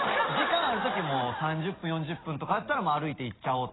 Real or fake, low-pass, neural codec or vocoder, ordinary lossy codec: real; 7.2 kHz; none; AAC, 16 kbps